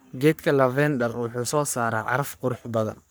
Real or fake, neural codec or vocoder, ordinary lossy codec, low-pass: fake; codec, 44.1 kHz, 3.4 kbps, Pupu-Codec; none; none